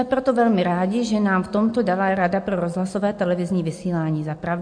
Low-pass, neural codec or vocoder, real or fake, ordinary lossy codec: 9.9 kHz; none; real; MP3, 48 kbps